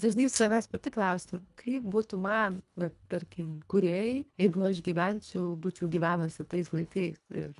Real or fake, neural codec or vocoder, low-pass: fake; codec, 24 kHz, 1.5 kbps, HILCodec; 10.8 kHz